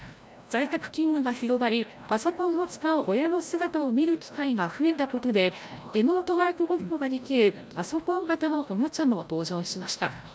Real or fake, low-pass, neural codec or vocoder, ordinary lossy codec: fake; none; codec, 16 kHz, 0.5 kbps, FreqCodec, larger model; none